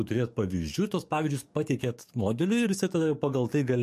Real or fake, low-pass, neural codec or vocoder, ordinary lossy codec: fake; 14.4 kHz; codec, 44.1 kHz, 7.8 kbps, DAC; MP3, 64 kbps